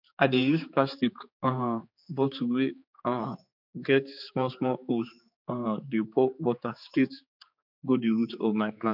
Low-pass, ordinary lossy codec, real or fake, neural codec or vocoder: 5.4 kHz; MP3, 48 kbps; fake; codec, 16 kHz, 4 kbps, X-Codec, HuBERT features, trained on general audio